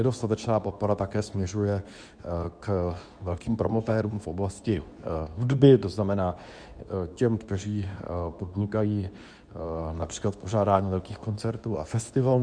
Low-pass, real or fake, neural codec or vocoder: 9.9 kHz; fake; codec, 24 kHz, 0.9 kbps, WavTokenizer, medium speech release version 2